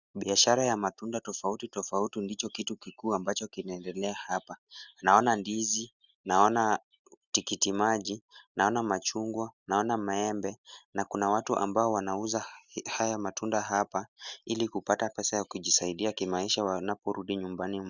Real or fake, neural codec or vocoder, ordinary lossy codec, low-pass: real; none; Opus, 64 kbps; 7.2 kHz